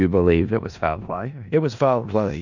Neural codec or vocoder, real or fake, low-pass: codec, 16 kHz in and 24 kHz out, 0.4 kbps, LongCat-Audio-Codec, four codebook decoder; fake; 7.2 kHz